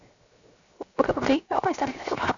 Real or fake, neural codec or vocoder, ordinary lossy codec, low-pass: fake; codec, 16 kHz, 0.7 kbps, FocalCodec; MP3, 96 kbps; 7.2 kHz